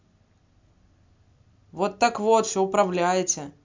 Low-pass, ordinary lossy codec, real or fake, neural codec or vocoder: 7.2 kHz; MP3, 64 kbps; real; none